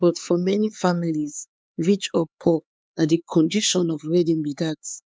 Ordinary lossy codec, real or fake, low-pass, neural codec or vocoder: none; fake; none; codec, 16 kHz, 4 kbps, X-Codec, HuBERT features, trained on LibriSpeech